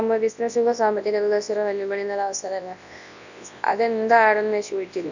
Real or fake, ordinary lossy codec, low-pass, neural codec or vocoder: fake; none; 7.2 kHz; codec, 24 kHz, 0.9 kbps, WavTokenizer, large speech release